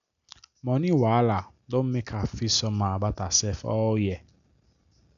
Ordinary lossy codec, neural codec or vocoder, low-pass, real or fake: none; none; 7.2 kHz; real